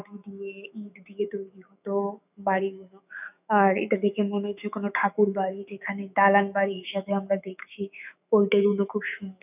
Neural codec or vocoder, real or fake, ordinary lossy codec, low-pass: autoencoder, 48 kHz, 128 numbers a frame, DAC-VAE, trained on Japanese speech; fake; none; 3.6 kHz